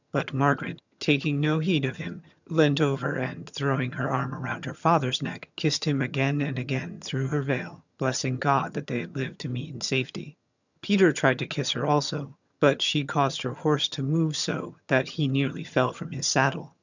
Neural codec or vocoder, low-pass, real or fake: vocoder, 22.05 kHz, 80 mel bands, HiFi-GAN; 7.2 kHz; fake